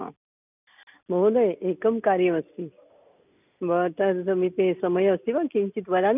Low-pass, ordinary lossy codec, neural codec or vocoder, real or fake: 3.6 kHz; none; none; real